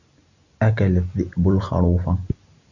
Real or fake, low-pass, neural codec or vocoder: real; 7.2 kHz; none